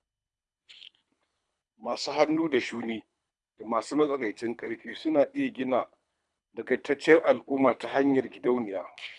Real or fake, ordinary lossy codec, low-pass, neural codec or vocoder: fake; none; 10.8 kHz; codec, 24 kHz, 3 kbps, HILCodec